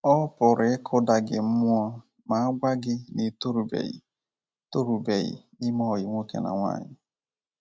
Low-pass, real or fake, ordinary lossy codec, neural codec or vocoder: none; real; none; none